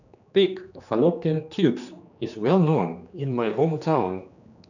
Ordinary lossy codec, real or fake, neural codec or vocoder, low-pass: none; fake; codec, 16 kHz, 2 kbps, X-Codec, HuBERT features, trained on general audio; 7.2 kHz